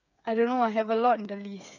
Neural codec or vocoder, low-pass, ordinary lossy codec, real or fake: codec, 16 kHz, 8 kbps, FreqCodec, smaller model; 7.2 kHz; none; fake